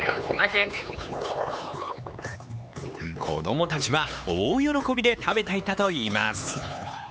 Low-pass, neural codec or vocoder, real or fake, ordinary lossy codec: none; codec, 16 kHz, 4 kbps, X-Codec, HuBERT features, trained on LibriSpeech; fake; none